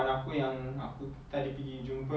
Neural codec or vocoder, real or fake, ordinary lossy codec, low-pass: none; real; none; none